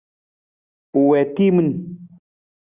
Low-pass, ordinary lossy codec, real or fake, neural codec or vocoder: 3.6 kHz; Opus, 64 kbps; real; none